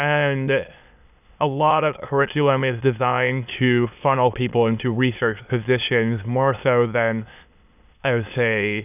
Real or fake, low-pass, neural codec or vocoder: fake; 3.6 kHz; autoencoder, 22.05 kHz, a latent of 192 numbers a frame, VITS, trained on many speakers